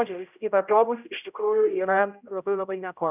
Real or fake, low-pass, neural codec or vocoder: fake; 3.6 kHz; codec, 16 kHz, 0.5 kbps, X-Codec, HuBERT features, trained on general audio